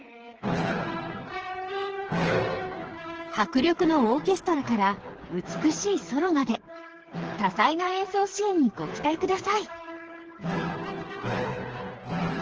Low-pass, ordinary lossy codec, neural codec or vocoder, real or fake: 7.2 kHz; Opus, 16 kbps; codec, 16 kHz, 4 kbps, FreqCodec, smaller model; fake